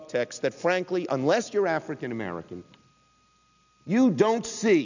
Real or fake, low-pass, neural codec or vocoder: real; 7.2 kHz; none